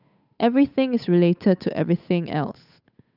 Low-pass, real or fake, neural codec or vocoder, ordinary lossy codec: 5.4 kHz; fake; codec, 16 kHz, 8 kbps, FunCodec, trained on Chinese and English, 25 frames a second; none